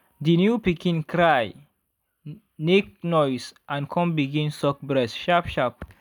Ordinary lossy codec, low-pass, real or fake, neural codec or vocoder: none; 19.8 kHz; real; none